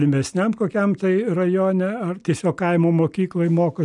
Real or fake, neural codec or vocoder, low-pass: real; none; 10.8 kHz